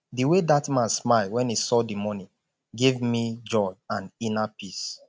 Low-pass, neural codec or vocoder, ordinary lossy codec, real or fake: none; none; none; real